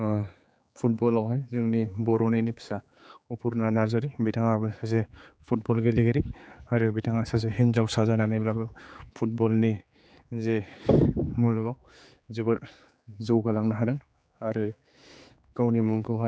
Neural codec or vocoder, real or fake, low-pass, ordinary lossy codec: codec, 16 kHz, 4 kbps, X-Codec, HuBERT features, trained on general audio; fake; none; none